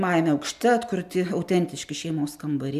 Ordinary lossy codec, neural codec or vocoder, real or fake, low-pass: MP3, 96 kbps; none; real; 14.4 kHz